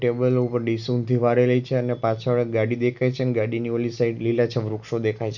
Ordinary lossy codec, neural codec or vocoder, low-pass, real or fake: none; none; 7.2 kHz; real